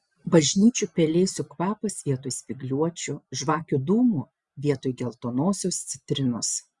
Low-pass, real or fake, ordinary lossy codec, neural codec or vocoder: 10.8 kHz; real; Opus, 64 kbps; none